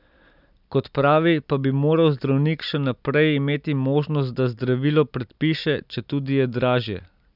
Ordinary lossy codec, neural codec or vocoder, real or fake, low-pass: none; none; real; 5.4 kHz